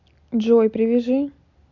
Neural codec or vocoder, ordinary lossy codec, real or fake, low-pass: none; none; real; 7.2 kHz